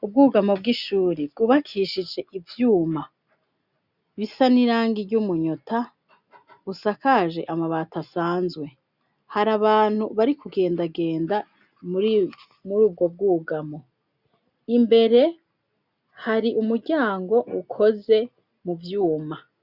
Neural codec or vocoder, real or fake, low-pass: none; real; 5.4 kHz